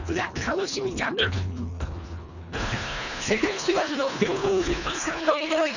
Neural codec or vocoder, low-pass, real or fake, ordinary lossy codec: codec, 24 kHz, 1.5 kbps, HILCodec; 7.2 kHz; fake; none